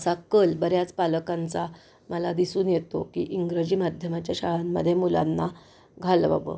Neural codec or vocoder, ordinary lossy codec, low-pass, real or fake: none; none; none; real